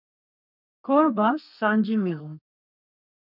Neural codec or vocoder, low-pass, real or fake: codec, 32 kHz, 1.9 kbps, SNAC; 5.4 kHz; fake